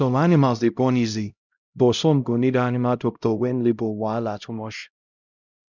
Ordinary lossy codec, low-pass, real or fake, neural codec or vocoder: none; 7.2 kHz; fake; codec, 16 kHz, 0.5 kbps, X-Codec, HuBERT features, trained on LibriSpeech